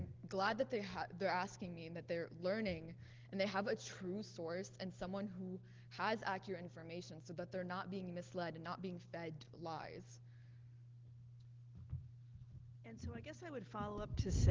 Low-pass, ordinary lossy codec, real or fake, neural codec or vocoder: 7.2 kHz; Opus, 16 kbps; real; none